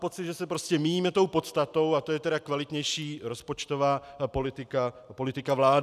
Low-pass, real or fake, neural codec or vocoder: 14.4 kHz; real; none